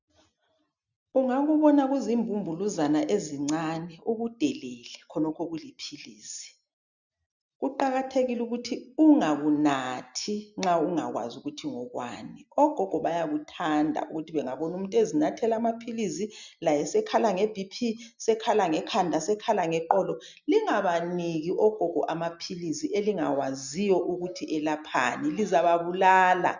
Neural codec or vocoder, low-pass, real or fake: none; 7.2 kHz; real